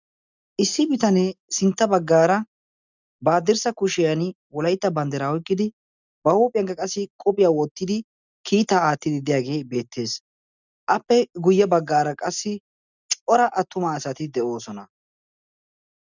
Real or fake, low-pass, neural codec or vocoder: real; 7.2 kHz; none